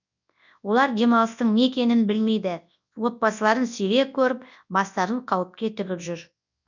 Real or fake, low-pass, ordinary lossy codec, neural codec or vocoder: fake; 7.2 kHz; none; codec, 24 kHz, 0.9 kbps, WavTokenizer, large speech release